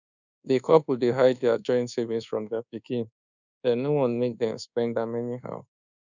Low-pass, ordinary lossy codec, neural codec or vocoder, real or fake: 7.2 kHz; none; codec, 24 kHz, 1.2 kbps, DualCodec; fake